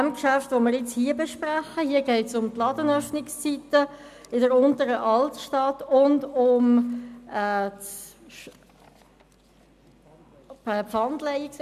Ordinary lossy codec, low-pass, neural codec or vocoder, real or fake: none; 14.4 kHz; none; real